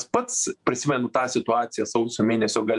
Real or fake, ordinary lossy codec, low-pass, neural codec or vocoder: fake; MP3, 96 kbps; 10.8 kHz; vocoder, 48 kHz, 128 mel bands, Vocos